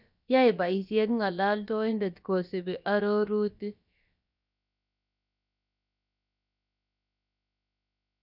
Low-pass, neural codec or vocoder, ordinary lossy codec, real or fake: 5.4 kHz; codec, 16 kHz, about 1 kbps, DyCAST, with the encoder's durations; none; fake